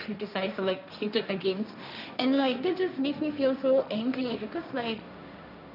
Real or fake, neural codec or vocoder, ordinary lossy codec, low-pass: fake; codec, 16 kHz, 1.1 kbps, Voila-Tokenizer; none; 5.4 kHz